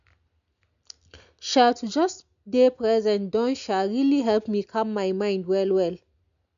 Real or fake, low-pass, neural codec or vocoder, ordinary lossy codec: real; 7.2 kHz; none; none